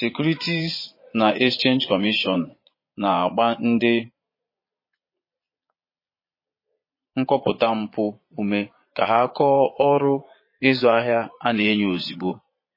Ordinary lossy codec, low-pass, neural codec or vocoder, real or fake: MP3, 24 kbps; 5.4 kHz; vocoder, 22.05 kHz, 80 mel bands, Vocos; fake